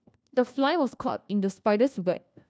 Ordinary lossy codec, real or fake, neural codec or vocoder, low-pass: none; fake; codec, 16 kHz, 1 kbps, FunCodec, trained on LibriTTS, 50 frames a second; none